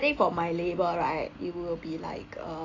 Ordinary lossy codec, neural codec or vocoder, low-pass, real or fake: AAC, 32 kbps; none; 7.2 kHz; real